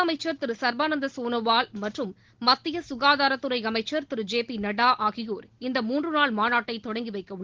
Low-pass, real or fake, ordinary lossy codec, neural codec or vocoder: 7.2 kHz; real; Opus, 16 kbps; none